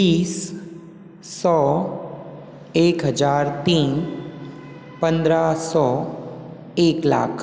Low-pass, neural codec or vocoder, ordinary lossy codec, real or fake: none; none; none; real